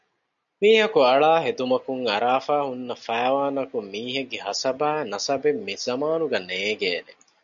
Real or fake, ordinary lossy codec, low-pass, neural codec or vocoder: real; MP3, 96 kbps; 7.2 kHz; none